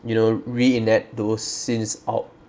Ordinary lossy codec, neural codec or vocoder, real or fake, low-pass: none; none; real; none